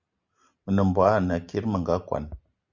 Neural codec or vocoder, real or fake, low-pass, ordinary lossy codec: none; real; 7.2 kHz; Opus, 64 kbps